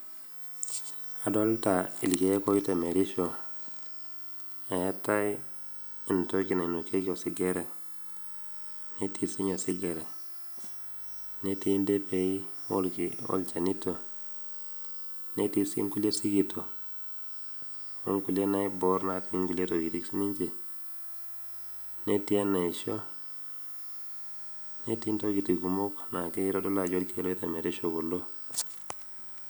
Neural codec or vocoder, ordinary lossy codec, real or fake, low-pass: none; none; real; none